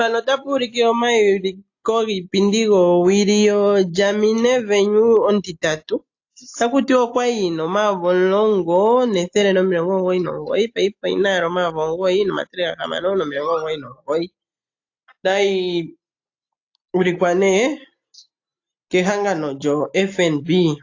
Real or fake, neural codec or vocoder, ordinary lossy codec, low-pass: real; none; AAC, 48 kbps; 7.2 kHz